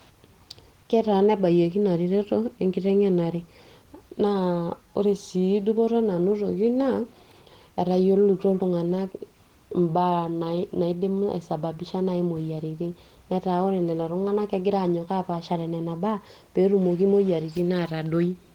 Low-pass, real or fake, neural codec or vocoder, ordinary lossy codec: 19.8 kHz; real; none; Opus, 16 kbps